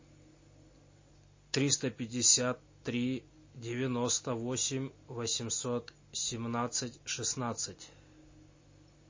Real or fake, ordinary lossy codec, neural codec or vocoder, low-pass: real; MP3, 32 kbps; none; 7.2 kHz